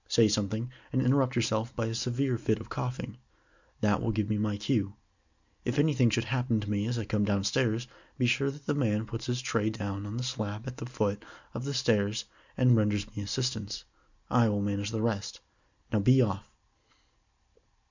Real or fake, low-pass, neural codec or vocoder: real; 7.2 kHz; none